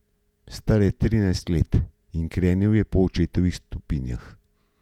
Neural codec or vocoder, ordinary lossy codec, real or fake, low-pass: vocoder, 48 kHz, 128 mel bands, Vocos; none; fake; 19.8 kHz